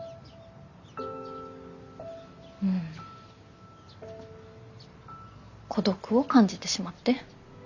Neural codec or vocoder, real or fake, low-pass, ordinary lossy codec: none; real; 7.2 kHz; Opus, 64 kbps